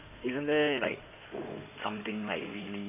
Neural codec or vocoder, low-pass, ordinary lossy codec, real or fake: codec, 16 kHz in and 24 kHz out, 2.2 kbps, FireRedTTS-2 codec; 3.6 kHz; none; fake